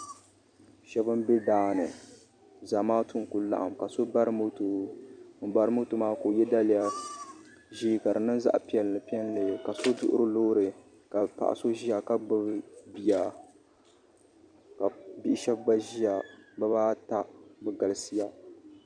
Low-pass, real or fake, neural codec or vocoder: 9.9 kHz; fake; vocoder, 44.1 kHz, 128 mel bands every 256 samples, BigVGAN v2